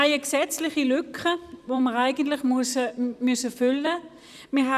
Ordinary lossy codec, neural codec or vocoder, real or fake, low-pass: none; vocoder, 44.1 kHz, 128 mel bands, Pupu-Vocoder; fake; 14.4 kHz